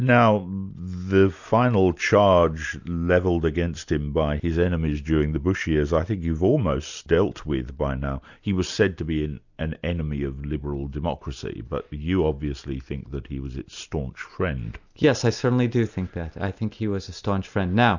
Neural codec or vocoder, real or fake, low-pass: none; real; 7.2 kHz